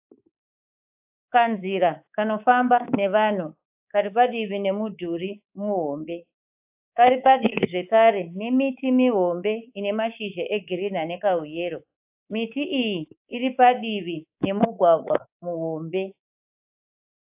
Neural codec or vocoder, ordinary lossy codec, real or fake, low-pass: codec, 24 kHz, 3.1 kbps, DualCodec; AAC, 32 kbps; fake; 3.6 kHz